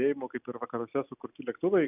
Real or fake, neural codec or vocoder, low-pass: real; none; 3.6 kHz